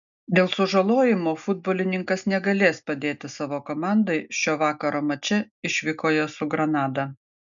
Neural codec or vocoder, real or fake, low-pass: none; real; 7.2 kHz